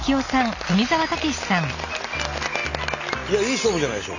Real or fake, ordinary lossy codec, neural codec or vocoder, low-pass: real; none; none; 7.2 kHz